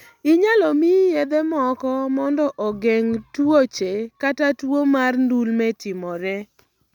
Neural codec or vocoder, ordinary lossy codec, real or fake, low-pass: none; none; real; 19.8 kHz